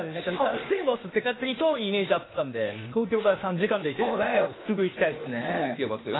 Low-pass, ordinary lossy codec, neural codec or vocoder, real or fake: 7.2 kHz; AAC, 16 kbps; codec, 16 kHz, 0.8 kbps, ZipCodec; fake